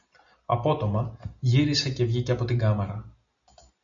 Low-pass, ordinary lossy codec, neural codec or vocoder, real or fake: 7.2 kHz; MP3, 64 kbps; none; real